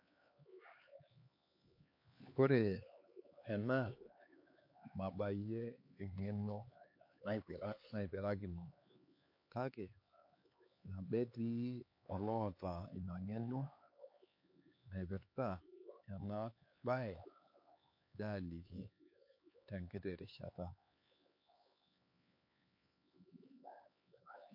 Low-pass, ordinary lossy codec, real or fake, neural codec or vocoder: 5.4 kHz; MP3, 32 kbps; fake; codec, 16 kHz, 4 kbps, X-Codec, HuBERT features, trained on LibriSpeech